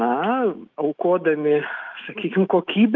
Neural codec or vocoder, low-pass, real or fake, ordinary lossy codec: autoencoder, 48 kHz, 128 numbers a frame, DAC-VAE, trained on Japanese speech; 7.2 kHz; fake; Opus, 32 kbps